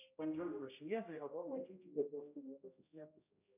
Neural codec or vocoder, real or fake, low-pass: codec, 16 kHz, 0.5 kbps, X-Codec, HuBERT features, trained on general audio; fake; 3.6 kHz